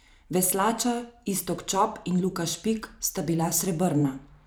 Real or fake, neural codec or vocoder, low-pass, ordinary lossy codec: fake; vocoder, 44.1 kHz, 128 mel bands every 512 samples, BigVGAN v2; none; none